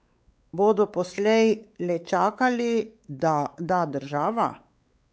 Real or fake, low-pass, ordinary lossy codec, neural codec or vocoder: fake; none; none; codec, 16 kHz, 4 kbps, X-Codec, WavLM features, trained on Multilingual LibriSpeech